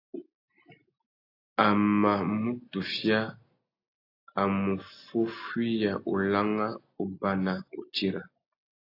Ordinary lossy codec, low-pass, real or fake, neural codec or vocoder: AAC, 32 kbps; 5.4 kHz; real; none